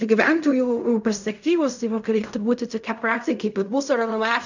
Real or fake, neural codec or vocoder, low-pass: fake; codec, 16 kHz in and 24 kHz out, 0.4 kbps, LongCat-Audio-Codec, fine tuned four codebook decoder; 7.2 kHz